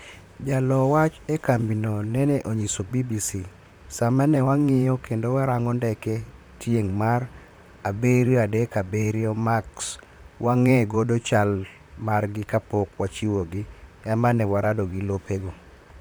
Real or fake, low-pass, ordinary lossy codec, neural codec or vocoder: fake; none; none; vocoder, 44.1 kHz, 128 mel bands, Pupu-Vocoder